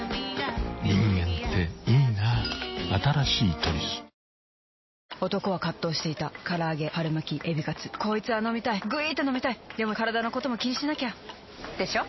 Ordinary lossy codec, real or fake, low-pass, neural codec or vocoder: MP3, 24 kbps; real; 7.2 kHz; none